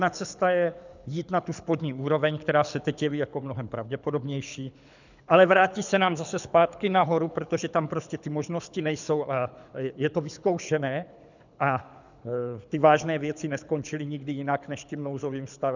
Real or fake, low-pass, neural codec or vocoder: fake; 7.2 kHz; codec, 24 kHz, 6 kbps, HILCodec